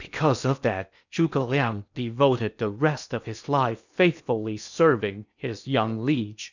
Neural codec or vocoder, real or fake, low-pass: codec, 16 kHz in and 24 kHz out, 0.8 kbps, FocalCodec, streaming, 65536 codes; fake; 7.2 kHz